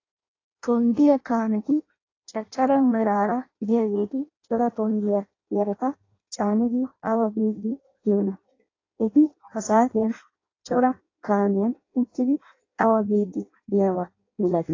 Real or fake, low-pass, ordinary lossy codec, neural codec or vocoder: fake; 7.2 kHz; AAC, 32 kbps; codec, 16 kHz in and 24 kHz out, 0.6 kbps, FireRedTTS-2 codec